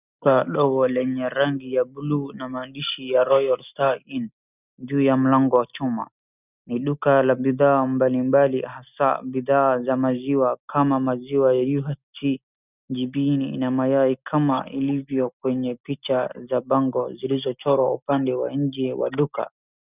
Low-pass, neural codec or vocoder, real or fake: 3.6 kHz; none; real